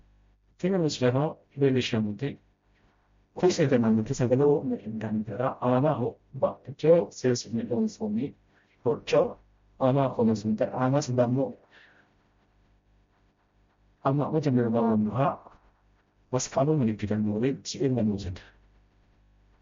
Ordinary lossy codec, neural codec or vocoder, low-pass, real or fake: MP3, 48 kbps; codec, 16 kHz, 0.5 kbps, FreqCodec, smaller model; 7.2 kHz; fake